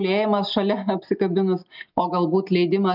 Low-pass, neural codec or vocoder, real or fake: 5.4 kHz; none; real